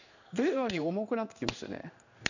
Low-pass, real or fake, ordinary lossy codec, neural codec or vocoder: 7.2 kHz; fake; none; codec, 16 kHz in and 24 kHz out, 1 kbps, XY-Tokenizer